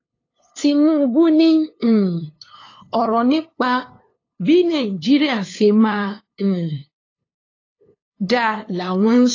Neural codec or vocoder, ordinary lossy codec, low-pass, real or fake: codec, 16 kHz, 2 kbps, FunCodec, trained on LibriTTS, 25 frames a second; AAC, 32 kbps; 7.2 kHz; fake